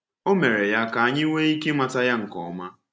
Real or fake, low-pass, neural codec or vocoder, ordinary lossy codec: real; none; none; none